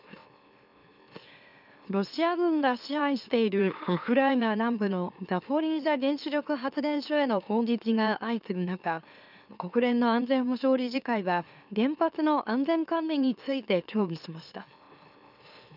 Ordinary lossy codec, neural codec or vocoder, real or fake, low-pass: none; autoencoder, 44.1 kHz, a latent of 192 numbers a frame, MeloTTS; fake; 5.4 kHz